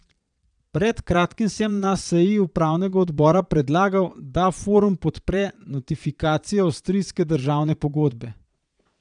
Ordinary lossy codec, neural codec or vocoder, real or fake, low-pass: none; vocoder, 22.05 kHz, 80 mel bands, Vocos; fake; 9.9 kHz